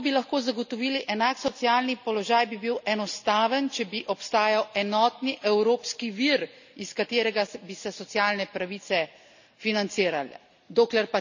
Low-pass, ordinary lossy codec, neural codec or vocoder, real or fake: 7.2 kHz; none; none; real